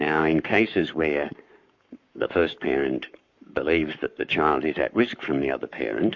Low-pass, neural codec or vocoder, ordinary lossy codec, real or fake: 7.2 kHz; codec, 44.1 kHz, 7.8 kbps, Pupu-Codec; MP3, 48 kbps; fake